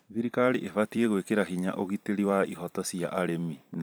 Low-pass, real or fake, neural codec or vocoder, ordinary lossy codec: none; fake; vocoder, 44.1 kHz, 128 mel bands every 256 samples, BigVGAN v2; none